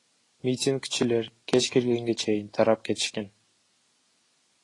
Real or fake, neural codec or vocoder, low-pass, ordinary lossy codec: real; none; 10.8 kHz; AAC, 32 kbps